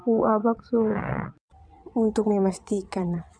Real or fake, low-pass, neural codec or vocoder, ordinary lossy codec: fake; 9.9 kHz; vocoder, 22.05 kHz, 80 mel bands, WaveNeXt; MP3, 96 kbps